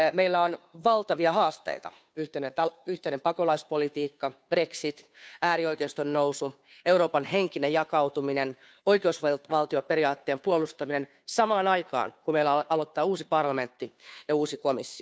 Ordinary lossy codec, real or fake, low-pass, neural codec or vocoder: none; fake; none; codec, 16 kHz, 2 kbps, FunCodec, trained on Chinese and English, 25 frames a second